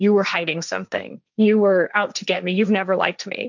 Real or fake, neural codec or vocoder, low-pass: fake; codec, 16 kHz, 1.1 kbps, Voila-Tokenizer; 7.2 kHz